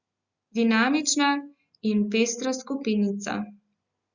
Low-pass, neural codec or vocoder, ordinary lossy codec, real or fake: 7.2 kHz; none; Opus, 64 kbps; real